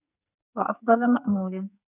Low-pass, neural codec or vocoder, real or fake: 3.6 kHz; codec, 44.1 kHz, 2.6 kbps, SNAC; fake